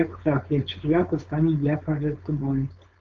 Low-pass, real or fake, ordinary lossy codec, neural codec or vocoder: 7.2 kHz; fake; Opus, 24 kbps; codec, 16 kHz, 4.8 kbps, FACodec